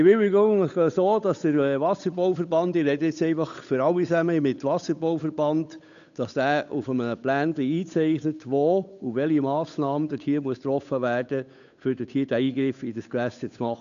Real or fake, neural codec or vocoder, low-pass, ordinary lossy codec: fake; codec, 16 kHz, 8 kbps, FunCodec, trained on Chinese and English, 25 frames a second; 7.2 kHz; none